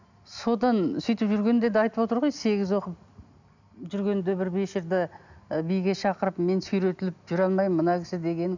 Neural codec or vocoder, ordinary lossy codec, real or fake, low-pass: none; none; real; 7.2 kHz